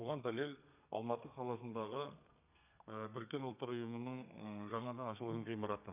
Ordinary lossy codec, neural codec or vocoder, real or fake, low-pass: none; codec, 32 kHz, 1.9 kbps, SNAC; fake; 3.6 kHz